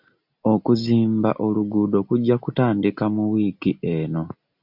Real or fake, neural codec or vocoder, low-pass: real; none; 5.4 kHz